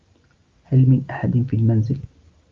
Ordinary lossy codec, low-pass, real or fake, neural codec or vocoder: Opus, 24 kbps; 7.2 kHz; real; none